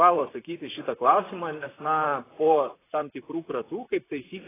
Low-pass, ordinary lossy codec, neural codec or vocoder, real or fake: 3.6 kHz; AAC, 16 kbps; vocoder, 44.1 kHz, 128 mel bands, Pupu-Vocoder; fake